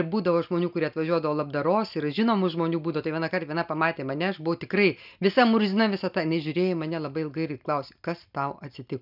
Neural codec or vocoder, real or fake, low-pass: none; real; 5.4 kHz